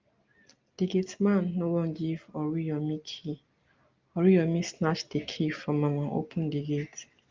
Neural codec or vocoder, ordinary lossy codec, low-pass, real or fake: none; Opus, 24 kbps; 7.2 kHz; real